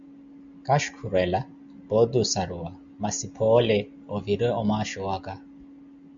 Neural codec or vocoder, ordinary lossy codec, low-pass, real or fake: none; Opus, 64 kbps; 7.2 kHz; real